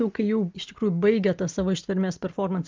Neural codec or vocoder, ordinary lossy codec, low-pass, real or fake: none; Opus, 24 kbps; 7.2 kHz; real